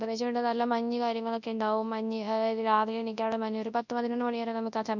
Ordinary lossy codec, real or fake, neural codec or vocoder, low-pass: none; fake; codec, 24 kHz, 0.9 kbps, WavTokenizer, large speech release; 7.2 kHz